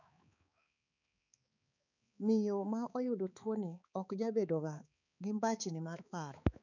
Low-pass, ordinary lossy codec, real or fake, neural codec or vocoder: 7.2 kHz; none; fake; codec, 16 kHz, 4 kbps, X-Codec, HuBERT features, trained on balanced general audio